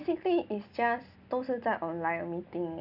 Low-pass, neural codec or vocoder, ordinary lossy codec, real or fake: 5.4 kHz; none; none; real